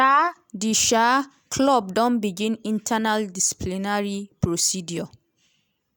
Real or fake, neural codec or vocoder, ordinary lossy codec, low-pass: real; none; none; none